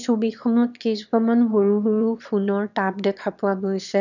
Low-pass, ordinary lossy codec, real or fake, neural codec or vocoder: 7.2 kHz; none; fake; autoencoder, 22.05 kHz, a latent of 192 numbers a frame, VITS, trained on one speaker